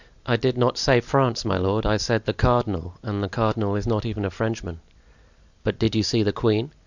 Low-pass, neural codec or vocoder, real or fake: 7.2 kHz; none; real